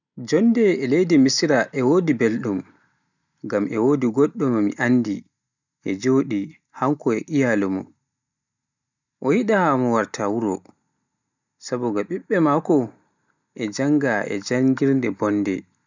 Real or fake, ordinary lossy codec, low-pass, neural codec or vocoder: real; none; 7.2 kHz; none